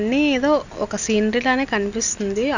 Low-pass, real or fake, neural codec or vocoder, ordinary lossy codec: 7.2 kHz; real; none; none